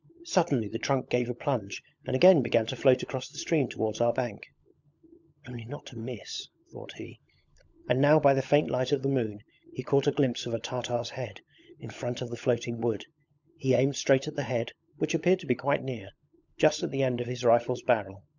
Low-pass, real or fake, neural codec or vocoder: 7.2 kHz; fake; codec, 16 kHz, 16 kbps, FunCodec, trained on LibriTTS, 50 frames a second